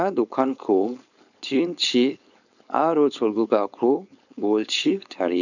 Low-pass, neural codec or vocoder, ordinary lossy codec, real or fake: 7.2 kHz; codec, 16 kHz, 4.8 kbps, FACodec; none; fake